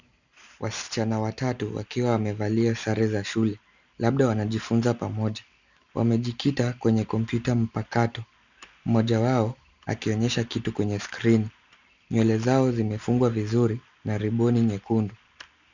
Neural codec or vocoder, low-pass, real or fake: none; 7.2 kHz; real